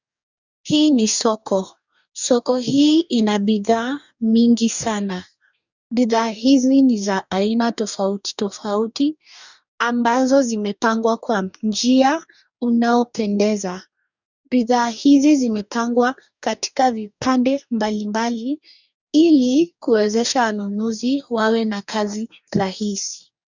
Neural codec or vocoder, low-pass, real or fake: codec, 44.1 kHz, 2.6 kbps, DAC; 7.2 kHz; fake